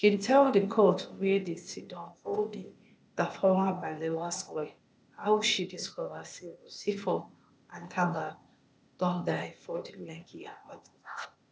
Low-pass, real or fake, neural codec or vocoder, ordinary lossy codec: none; fake; codec, 16 kHz, 0.8 kbps, ZipCodec; none